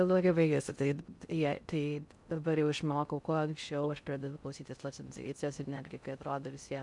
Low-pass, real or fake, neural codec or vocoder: 10.8 kHz; fake; codec, 16 kHz in and 24 kHz out, 0.6 kbps, FocalCodec, streaming, 2048 codes